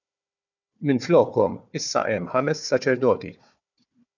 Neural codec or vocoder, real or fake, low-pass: codec, 16 kHz, 4 kbps, FunCodec, trained on Chinese and English, 50 frames a second; fake; 7.2 kHz